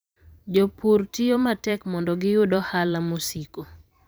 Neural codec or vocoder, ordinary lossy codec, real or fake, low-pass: vocoder, 44.1 kHz, 128 mel bands every 512 samples, BigVGAN v2; none; fake; none